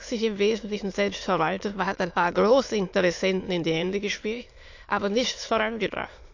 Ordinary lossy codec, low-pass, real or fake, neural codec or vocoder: AAC, 48 kbps; 7.2 kHz; fake; autoencoder, 22.05 kHz, a latent of 192 numbers a frame, VITS, trained on many speakers